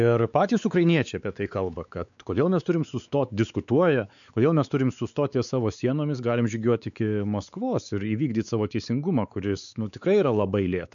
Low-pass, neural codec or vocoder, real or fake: 7.2 kHz; codec, 16 kHz, 4 kbps, X-Codec, WavLM features, trained on Multilingual LibriSpeech; fake